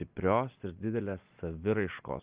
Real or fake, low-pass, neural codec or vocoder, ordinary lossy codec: real; 3.6 kHz; none; Opus, 24 kbps